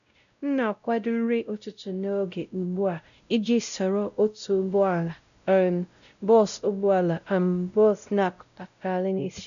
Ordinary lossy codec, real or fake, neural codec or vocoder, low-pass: none; fake; codec, 16 kHz, 0.5 kbps, X-Codec, WavLM features, trained on Multilingual LibriSpeech; 7.2 kHz